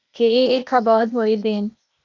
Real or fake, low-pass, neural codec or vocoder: fake; 7.2 kHz; codec, 16 kHz, 0.8 kbps, ZipCodec